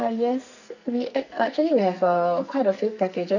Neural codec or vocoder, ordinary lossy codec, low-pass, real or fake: codec, 44.1 kHz, 2.6 kbps, SNAC; none; 7.2 kHz; fake